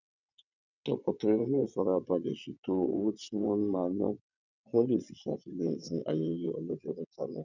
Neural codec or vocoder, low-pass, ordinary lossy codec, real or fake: vocoder, 22.05 kHz, 80 mel bands, WaveNeXt; 7.2 kHz; none; fake